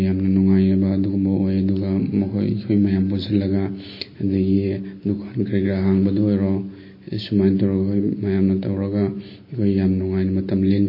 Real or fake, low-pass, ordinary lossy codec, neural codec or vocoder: real; 5.4 kHz; MP3, 24 kbps; none